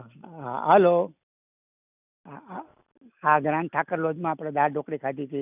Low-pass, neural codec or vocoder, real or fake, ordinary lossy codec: 3.6 kHz; none; real; none